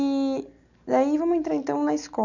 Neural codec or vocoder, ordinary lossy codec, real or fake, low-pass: none; none; real; 7.2 kHz